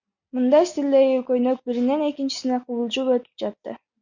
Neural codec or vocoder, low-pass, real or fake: none; 7.2 kHz; real